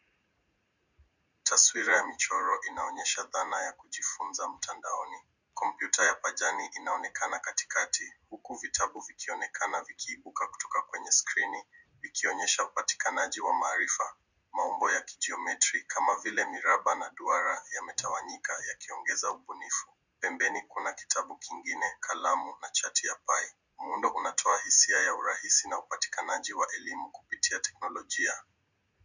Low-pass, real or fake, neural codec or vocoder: 7.2 kHz; fake; vocoder, 44.1 kHz, 80 mel bands, Vocos